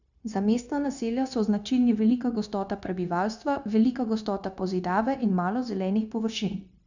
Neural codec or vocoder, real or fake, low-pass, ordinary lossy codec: codec, 16 kHz, 0.9 kbps, LongCat-Audio-Codec; fake; 7.2 kHz; none